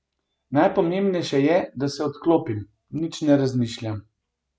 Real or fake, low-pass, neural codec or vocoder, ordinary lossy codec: real; none; none; none